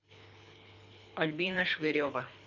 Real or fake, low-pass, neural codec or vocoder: fake; 7.2 kHz; codec, 24 kHz, 3 kbps, HILCodec